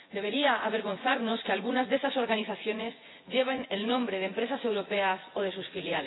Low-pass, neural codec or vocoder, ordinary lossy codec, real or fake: 7.2 kHz; vocoder, 24 kHz, 100 mel bands, Vocos; AAC, 16 kbps; fake